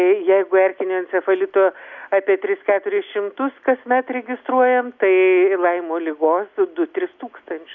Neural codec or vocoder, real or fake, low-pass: none; real; 7.2 kHz